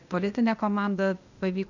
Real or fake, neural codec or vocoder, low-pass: fake; codec, 16 kHz, 0.8 kbps, ZipCodec; 7.2 kHz